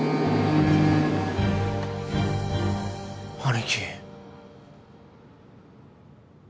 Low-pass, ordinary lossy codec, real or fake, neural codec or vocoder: none; none; real; none